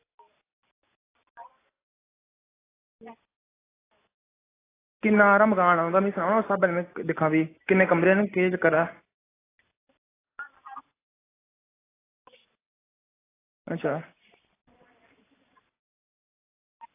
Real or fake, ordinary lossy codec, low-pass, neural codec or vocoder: real; AAC, 16 kbps; 3.6 kHz; none